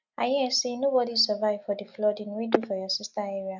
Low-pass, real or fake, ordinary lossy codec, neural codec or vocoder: 7.2 kHz; real; none; none